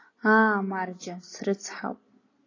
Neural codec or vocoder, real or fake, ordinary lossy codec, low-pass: none; real; AAC, 32 kbps; 7.2 kHz